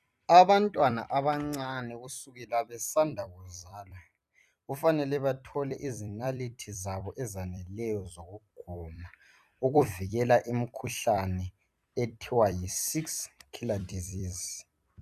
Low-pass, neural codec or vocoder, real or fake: 14.4 kHz; vocoder, 44.1 kHz, 128 mel bands every 256 samples, BigVGAN v2; fake